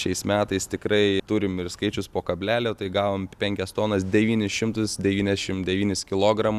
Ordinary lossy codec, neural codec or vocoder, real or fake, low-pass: Opus, 64 kbps; none; real; 14.4 kHz